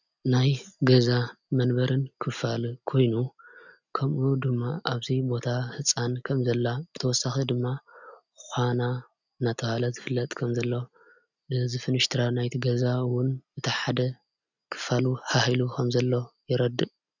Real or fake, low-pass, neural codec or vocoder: real; 7.2 kHz; none